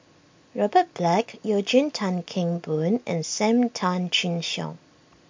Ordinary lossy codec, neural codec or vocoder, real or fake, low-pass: MP3, 48 kbps; none; real; 7.2 kHz